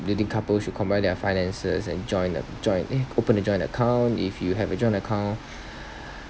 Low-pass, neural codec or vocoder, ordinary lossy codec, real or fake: none; none; none; real